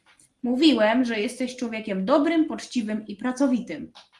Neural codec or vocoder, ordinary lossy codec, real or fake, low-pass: none; Opus, 24 kbps; real; 10.8 kHz